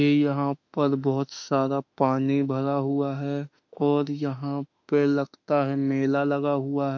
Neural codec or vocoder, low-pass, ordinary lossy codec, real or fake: autoencoder, 48 kHz, 32 numbers a frame, DAC-VAE, trained on Japanese speech; 7.2 kHz; MP3, 64 kbps; fake